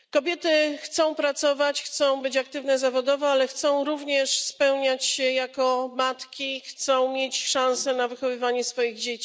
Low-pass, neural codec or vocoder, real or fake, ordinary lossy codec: none; none; real; none